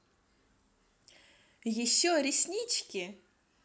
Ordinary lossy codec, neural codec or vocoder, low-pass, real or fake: none; none; none; real